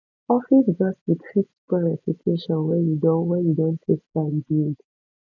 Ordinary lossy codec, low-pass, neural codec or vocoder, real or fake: none; 7.2 kHz; none; real